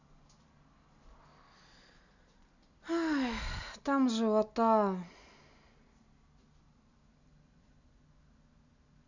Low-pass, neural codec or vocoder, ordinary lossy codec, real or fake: 7.2 kHz; none; none; real